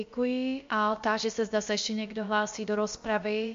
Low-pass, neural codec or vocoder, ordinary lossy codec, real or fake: 7.2 kHz; codec, 16 kHz, about 1 kbps, DyCAST, with the encoder's durations; MP3, 48 kbps; fake